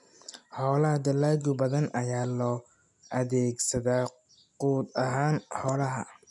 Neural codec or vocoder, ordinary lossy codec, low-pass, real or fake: none; none; 10.8 kHz; real